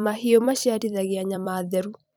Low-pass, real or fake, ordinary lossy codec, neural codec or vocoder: none; fake; none; vocoder, 44.1 kHz, 128 mel bands every 256 samples, BigVGAN v2